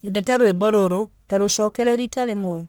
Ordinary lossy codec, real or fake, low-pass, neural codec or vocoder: none; fake; none; codec, 44.1 kHz, 1.7 kbps, Pupu-Codec